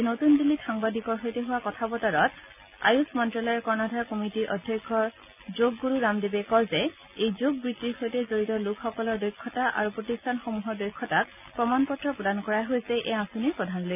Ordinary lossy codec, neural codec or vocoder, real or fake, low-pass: none; none; real; 3.6 kHz